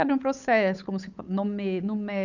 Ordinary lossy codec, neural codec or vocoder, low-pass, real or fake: none; codec, 16 kHz, 8 kbps, FunCodec, trained on Chinese and English, 25 frames a second; 7.2 kHz; fake